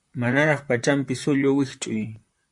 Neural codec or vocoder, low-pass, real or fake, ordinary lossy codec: vocoder, 44.1 kHz, 128 mel bands, Pupu-Vocoder; 10.8 kHz; fake; MP3, 64 kbps